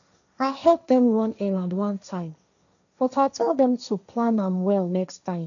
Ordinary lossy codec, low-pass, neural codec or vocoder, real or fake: none; 7.2 kHz; codec, 16 kHz, 1.1 kbps, Voila-Tokenizer; fake